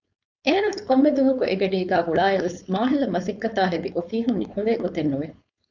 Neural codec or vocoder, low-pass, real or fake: codec, 16 kHz, 4.8 kbps, FACodec; 7.2 kHz; fake